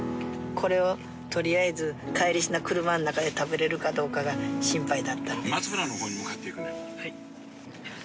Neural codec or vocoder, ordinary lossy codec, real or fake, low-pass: none; none; real; none